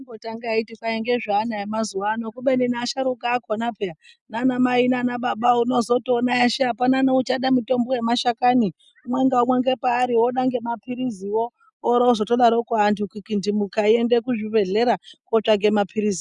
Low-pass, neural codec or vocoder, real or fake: 10.8 kHz; none; real